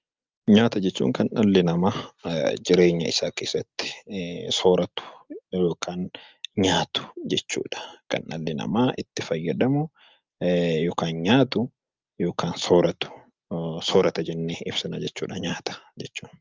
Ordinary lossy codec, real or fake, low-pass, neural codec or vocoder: Opus, 24 kbps; real; 7.2 kHz; none